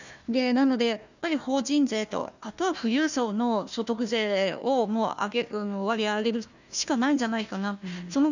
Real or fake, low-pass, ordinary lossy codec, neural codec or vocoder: fake; 7.2 kHz; none; codec, 16 kHz, 1 kbps, FunCodec, trained on Chinese and English, 50 frames a second